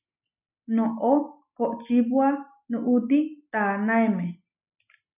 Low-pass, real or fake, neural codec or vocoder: 3.6 kHz; real; none